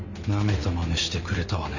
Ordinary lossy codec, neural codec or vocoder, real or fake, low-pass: none; none; real; 7.2 kHz